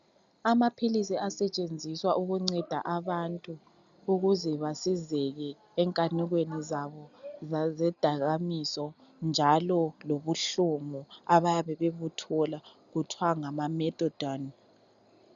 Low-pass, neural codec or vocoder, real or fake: 7.2 kHz; none; real